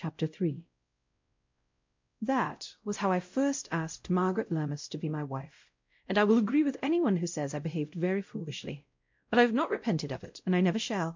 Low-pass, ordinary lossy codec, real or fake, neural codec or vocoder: 7.2 kHz; MP3, 48 kbps; fake; codec, 16 kHz, 0.5 kbps, X-Codec, WavLM features, trained on Multilingual LibriSpeech